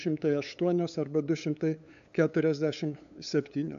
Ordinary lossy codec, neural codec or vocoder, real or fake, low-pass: AAC, 96 kbps; codec, 16 kHz, 8 kbps, FunCodec, trained on LibriTTS, 25 frames a second; fake; 7.2 kHz